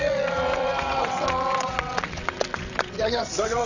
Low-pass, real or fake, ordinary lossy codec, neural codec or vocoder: 7.2 kHz; fake; none; vocoder, 22.05 kHz, 80 mel bands, WaveNeXt